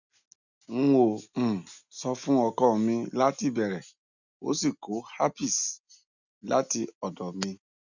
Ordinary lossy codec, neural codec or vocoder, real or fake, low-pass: none; none; real; 7.2 kHz